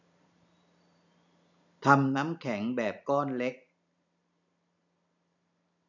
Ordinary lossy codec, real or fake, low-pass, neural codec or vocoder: none; fake; 7.2 kHz; vocoder, 44.1 kHz, 128 mel bands every 512 samples, BigVGAN v2